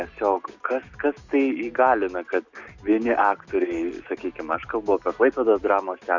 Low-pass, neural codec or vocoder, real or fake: 7.2 kHz; none; real